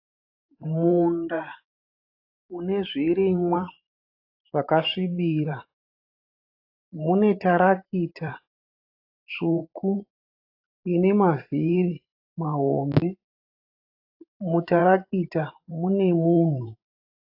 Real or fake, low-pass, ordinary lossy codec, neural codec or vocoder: fake; 5.4 kHz; AAC, 32 kbps; vocoder, 44.1 kHz, 128 mel bands every 512 samples, BigVGAN v2